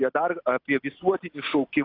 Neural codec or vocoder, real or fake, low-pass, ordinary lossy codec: none; real; 5.4 kHz; AAC, 32 kbps